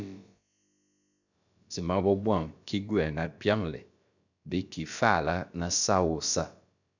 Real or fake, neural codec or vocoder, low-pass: fake; codec, 16 kHz, about 1 kbps, DyCAST, with the encoder's durations; 7.2 kHz